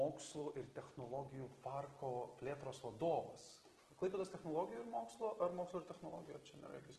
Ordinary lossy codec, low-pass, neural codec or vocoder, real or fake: Opus, 16 kbps; 10.8 kHz; none; real